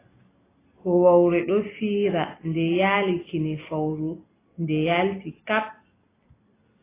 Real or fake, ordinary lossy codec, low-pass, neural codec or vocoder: real; AAC, 16 kbps; 3.6 kHz; none